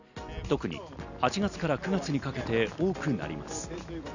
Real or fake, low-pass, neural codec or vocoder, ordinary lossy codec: real; 7.2 kHz; none; none